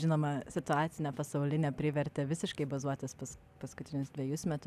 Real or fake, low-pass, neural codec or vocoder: real; 14.4 kHz; none